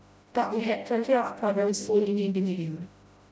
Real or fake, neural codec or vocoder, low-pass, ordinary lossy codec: fake; codec, 16 kHz, 0.5 kbps, FreqCodec, smaller model; none; none